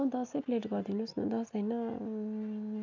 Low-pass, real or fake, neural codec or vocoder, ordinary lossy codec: 7.2 kHz; real; none; none